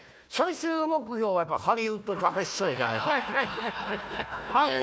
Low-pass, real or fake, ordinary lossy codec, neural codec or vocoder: none; fake; none; codec, 16 kHz, 1 kbps, FunCodec, trained on Chinese and English, 50 frames a second